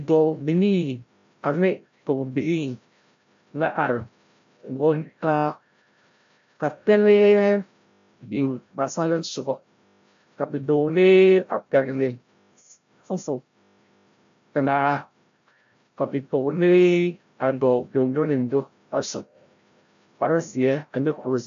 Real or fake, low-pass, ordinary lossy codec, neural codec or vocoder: fake; 7.2 kHz; AAC, 64 kbps; codec, 16 kHz, 0.5 kbps, FreqCodec, larger model